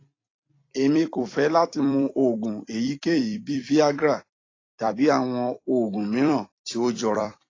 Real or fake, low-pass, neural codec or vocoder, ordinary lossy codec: fake; 7.2 kHz; vocoder, 44.1 kHz, 128 mel bands every 256 samples, BigVGAN v2; AAC, 32 kbps